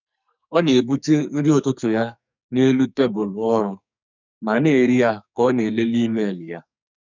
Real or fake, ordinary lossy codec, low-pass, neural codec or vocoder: fake; none; 7.2 kHz; codec, 44.1 kHz, 2.6 kbps, SNAC